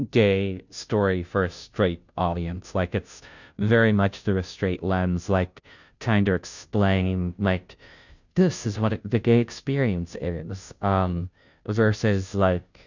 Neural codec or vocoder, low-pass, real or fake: codec, 16 kHz, 0.5 kbps, FunCodec, trained on Chinese and English, 25 frames a second; 7.2 kHz; fake